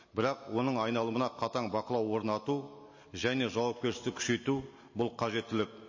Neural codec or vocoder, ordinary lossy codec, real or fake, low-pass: none; MP3, 32 kbps; real; 7.2 kHz